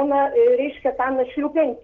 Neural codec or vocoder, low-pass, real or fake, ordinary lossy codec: none; 7.2 kHz; real; Opus, 16 kbps